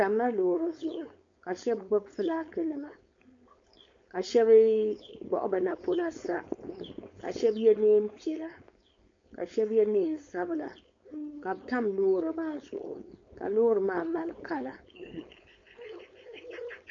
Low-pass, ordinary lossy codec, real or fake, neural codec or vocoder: 7.2 kHz; MP3, 48 kbps; fake; codec, 16 kHz, 4.8 kbps, FACodec